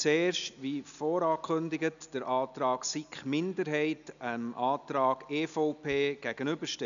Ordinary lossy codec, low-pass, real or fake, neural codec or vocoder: none; 7.2 kHz; real; none